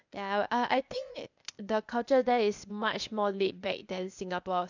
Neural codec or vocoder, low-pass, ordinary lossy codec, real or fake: codec, 16 kHz, 0.8 kbps, ZipCodec; 7.2 kHz; none; fake